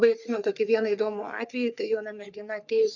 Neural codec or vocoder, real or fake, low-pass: codec, 44.1 kHz, 3.4 kbps, Pupu-Codec; fake; 7.2 kHz